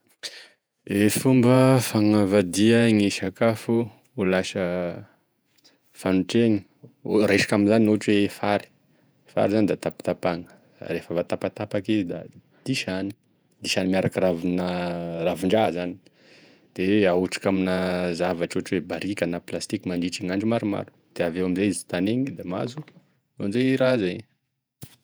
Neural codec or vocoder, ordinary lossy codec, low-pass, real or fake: vocoder, 48 kHz, 128 mel bands, Vocos; none; none; fake